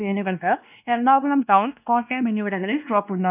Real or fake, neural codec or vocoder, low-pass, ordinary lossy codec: fake; codec, 16 kHz, 1 kbps, X-Codec, WavLM features, trained on Multilingual LibriSpeech; 3.6 kHz; none